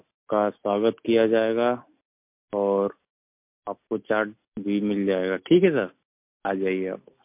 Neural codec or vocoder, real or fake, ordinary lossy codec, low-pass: none; real; MP3, 32 kbps; 3.6 kHz